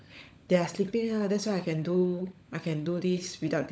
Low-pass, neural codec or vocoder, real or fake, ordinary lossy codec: none; codec, 16 kHz, 16 kbps, FunCodec, trained on LibriTTS, 50 frames a second; fake; none